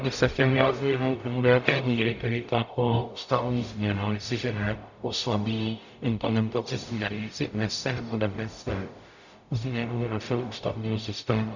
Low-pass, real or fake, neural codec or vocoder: 7.2 kHz; fake; codec, 44.1 kHz, 0.9 kbps, DAC